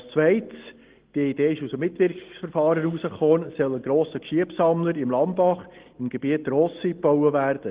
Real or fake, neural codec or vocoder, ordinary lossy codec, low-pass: real; none; Opus, 16 kbps; 3.6 kHz